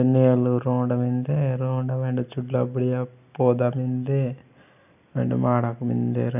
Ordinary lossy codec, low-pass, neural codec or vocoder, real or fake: none; 3.6 kHz; none; real